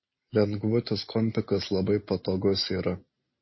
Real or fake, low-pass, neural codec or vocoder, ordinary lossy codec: real; 7.2 kHz; none; MP3, 24 kbps